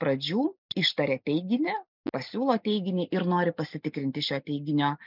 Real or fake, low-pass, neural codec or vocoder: real; 5.4 kHz; none